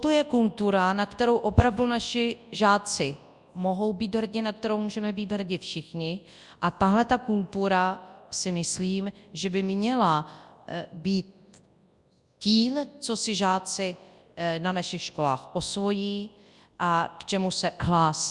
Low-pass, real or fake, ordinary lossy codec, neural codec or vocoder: 10.8 kHz; fake; Opus, 64 kbps; codec, 24 kHz, 0.9 kbps, WavTokenizer, large speech release